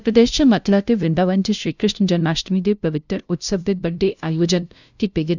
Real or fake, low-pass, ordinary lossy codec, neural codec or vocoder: fake; 7.2 kHz; none; codec, 16 kHz, 0.5 kbps, FunCodec, trained on LibriTTS, 25 frames a second